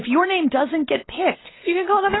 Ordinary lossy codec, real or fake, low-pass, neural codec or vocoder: AAC, 16 kbps; real; 7.2 kHz; none